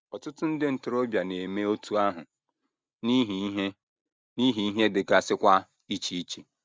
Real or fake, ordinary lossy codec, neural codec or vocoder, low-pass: real; none; none; none